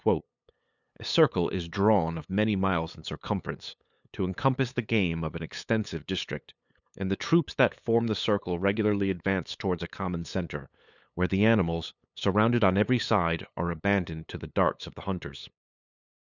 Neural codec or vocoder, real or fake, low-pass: codec, 16 kHz, 8 kbps, FunCodec, trained on LibriTTS, 25 frames a second; fake; 7.2 kHz